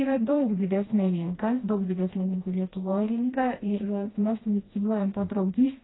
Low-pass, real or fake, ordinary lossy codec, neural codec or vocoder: 7.2 kHz; fake; AAC, 16 kbps; codec, 16 kHz, 1 kbps, FreqCodec, smaller model